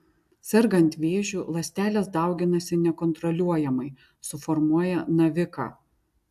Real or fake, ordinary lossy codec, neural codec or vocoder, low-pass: real; AAC, 96 kbps; none; 14.4 kHz